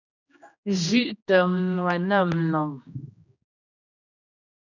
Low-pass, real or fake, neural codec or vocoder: 7.2 kHz; fake; codec, 16 kHz, 1 kbps, X-Codec, HuBERT features, trained on general audio